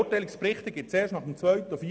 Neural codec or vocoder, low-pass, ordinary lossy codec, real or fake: none; none; none; real